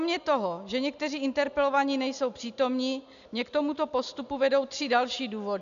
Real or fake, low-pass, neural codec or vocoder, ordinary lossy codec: real; 7.2 kHz; none; MP3, 96 kbps